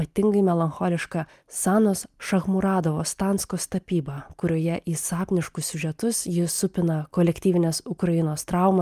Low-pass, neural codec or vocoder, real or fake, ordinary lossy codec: 14.4 kHz; none; real; Opus, 32 kbps